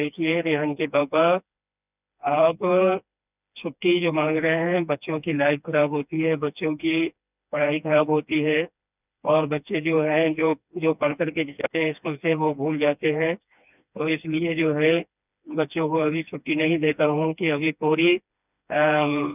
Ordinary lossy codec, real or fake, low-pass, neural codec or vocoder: none; fake; 3.6 kHz; codec, 16 kHz, 2 kbps, FreqCodec, smaller model